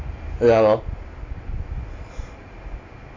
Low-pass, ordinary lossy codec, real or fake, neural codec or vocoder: 7.2 kHz; AAC, 32 kbps; real; none